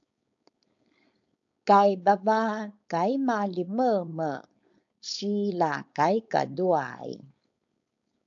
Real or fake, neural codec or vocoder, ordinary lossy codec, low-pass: fake; codec, 16 kHz, 4.8 kbps, FACodec; AAC, 64 kbps; 7.2 kHz